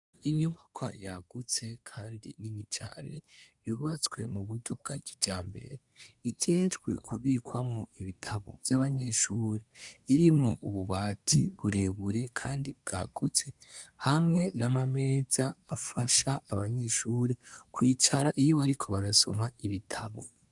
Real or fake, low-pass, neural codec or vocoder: fake; 10.8 kHz; codec, 24 kHz, 1 kbps, SNAC